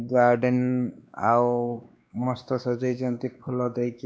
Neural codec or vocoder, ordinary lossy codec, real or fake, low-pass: codec, 16 kHz, 2 kbps, X-Codec, WavLM features, trained on Multilingual LibriSpeech; none; fake; none